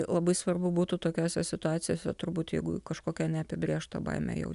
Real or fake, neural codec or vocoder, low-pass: real; none; 10.8 kHz